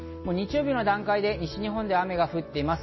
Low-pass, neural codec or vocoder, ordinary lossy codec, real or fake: 7.2 kHz; none; MP3, 24 kbps; real